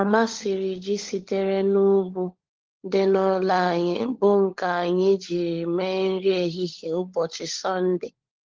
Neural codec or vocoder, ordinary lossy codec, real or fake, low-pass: codec, 16 kHz, 16 kbps, FunCodec, trained on LibriTTS, 50 frames a second; Opus, 16 kbps; fake; 7.2 kHz